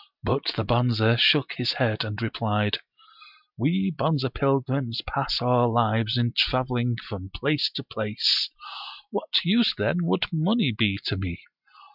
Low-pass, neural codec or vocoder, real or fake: 5.4 kHz; none; real